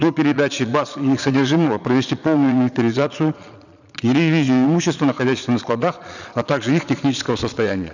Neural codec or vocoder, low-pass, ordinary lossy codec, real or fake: vocoder, 22.05 kHz, 80 mel bands, Vocos; 7.2 kHz; none; fake